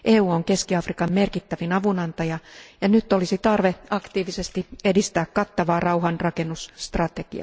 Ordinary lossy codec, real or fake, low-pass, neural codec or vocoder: none; real; none; none